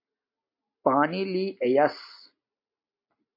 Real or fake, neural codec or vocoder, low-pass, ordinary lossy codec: real; none; 5.4 kHz; MP3, 32 kbps